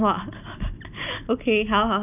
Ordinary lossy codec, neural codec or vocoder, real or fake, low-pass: none; none; real; 3.6 kHz